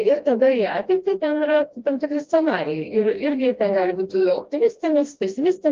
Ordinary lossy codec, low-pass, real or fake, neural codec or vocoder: Opus, 32 kbps; 7.2 kHz; fake; codec, 16 kHz, 1 kbps, FreqCodec, smaller model